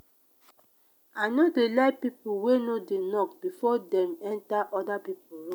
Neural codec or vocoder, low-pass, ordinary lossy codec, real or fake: none; none; none; real